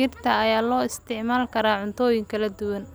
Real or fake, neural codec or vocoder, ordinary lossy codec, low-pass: real; none; none; none